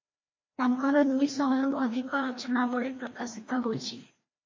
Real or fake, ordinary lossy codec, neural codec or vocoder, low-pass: fake; MP3, 32 kbps; codec, 16 kHz, 1 kbps, FreqCodec, larger model; 7.2 kHz